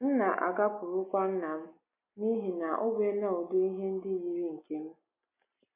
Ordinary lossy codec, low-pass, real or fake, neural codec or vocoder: MP3, 24 kbps; 3.6 kHz; real; none